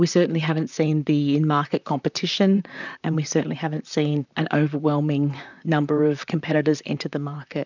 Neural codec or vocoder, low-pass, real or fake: vocoder, 44.1 kHz, 128 mel bands, Pupu-Vocoder; 7.2 kHz; fake